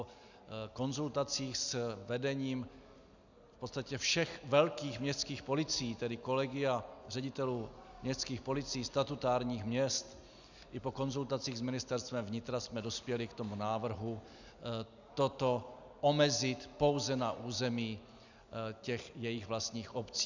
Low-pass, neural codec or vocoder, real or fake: 7.2 kHz; none; real